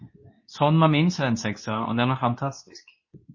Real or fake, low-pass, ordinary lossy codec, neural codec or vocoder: fake; 7.2 kHz; MP3, 32 kbps; codec, 24 kHz, 0.9 kbps, WavTokenizer, medium speech release version 2